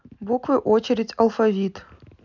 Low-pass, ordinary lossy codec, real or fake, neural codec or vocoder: 7.2 kHz; none; real; none